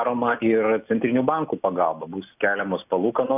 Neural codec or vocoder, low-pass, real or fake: none; 3.6 kHz; real